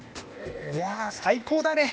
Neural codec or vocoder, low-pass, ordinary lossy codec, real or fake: codec, 16 kHz, 0.8 kbps, ZipCodec; none; none; fake